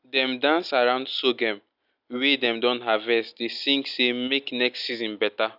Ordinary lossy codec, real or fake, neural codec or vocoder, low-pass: none; real; none; 5.4 kHz